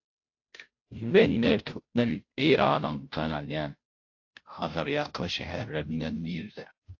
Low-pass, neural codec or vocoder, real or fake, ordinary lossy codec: 7.2 kHz; codec, 16 kHz, 0.5 kbps, FunCodec, trained on Chinese and English, 25 frames a second; fake; AAC, 48 kbps